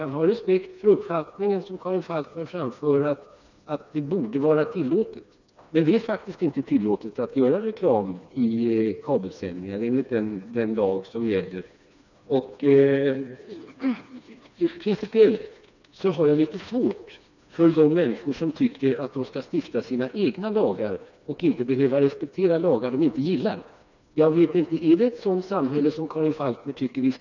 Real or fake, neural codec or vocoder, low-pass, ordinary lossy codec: fake; codec, 16 kHz, 2 kbps, FreqCodec, smaller model; 7.2 kHz; none